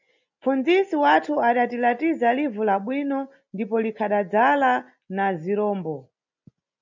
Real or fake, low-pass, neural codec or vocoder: real; 7.2 kHz; none